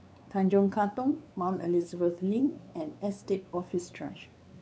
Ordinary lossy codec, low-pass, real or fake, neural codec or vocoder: none; none; fake; codec, 16 kHz, 4 kbps, X-Codec, WavLM features, trained on Multilingual LibriSpeech